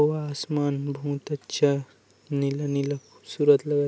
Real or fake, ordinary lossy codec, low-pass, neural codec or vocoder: real; none; none; none